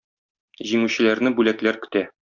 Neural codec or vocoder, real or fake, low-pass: none; real; 7.2 kHz